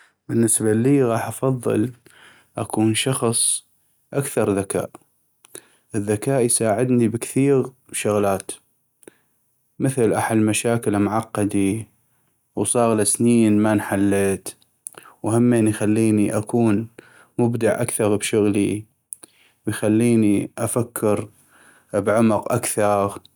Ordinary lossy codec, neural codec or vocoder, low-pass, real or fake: none; none; none; real